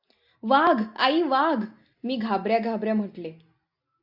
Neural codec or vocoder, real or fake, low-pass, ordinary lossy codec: none; real; 5.4 kHz; AAC, 48 kbps